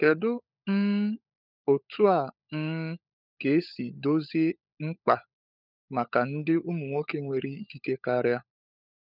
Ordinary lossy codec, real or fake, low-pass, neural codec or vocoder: none; fake; 5.4 kHz; codec, 16 kHz, 16 kbps, FunCodec, trained on LibriTTS, 50 frames a second